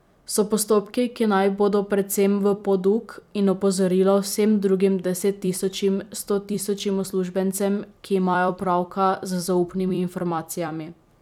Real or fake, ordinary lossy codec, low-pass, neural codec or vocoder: fake; none; 19.8 kHz; vocoder, 44.1 kHz, 128 mel bands every 256 samples, BigVGAN v2